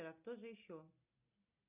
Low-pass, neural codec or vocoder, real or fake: 3.6 kHz; none; real